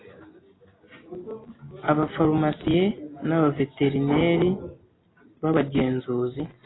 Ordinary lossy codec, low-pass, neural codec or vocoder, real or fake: AAC, 16 kbps; 7.2 kHz; none; real